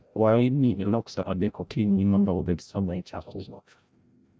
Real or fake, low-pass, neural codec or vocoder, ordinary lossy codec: fake; none; codec, 16 kHz, 0.5 kbps, FreqCodec, larger model; none